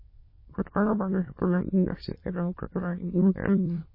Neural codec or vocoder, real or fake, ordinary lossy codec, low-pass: autoencoder, 22.05 kHz, a latent of 192 numbers a frame, VITS, trained on many speakers; fake; MP3, 24 kbps; 5.4 kHz